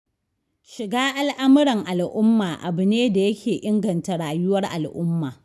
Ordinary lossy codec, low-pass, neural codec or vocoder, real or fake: none; none; none; real